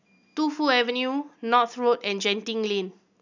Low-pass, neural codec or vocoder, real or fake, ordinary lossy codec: 7.2 kHz; none; real; none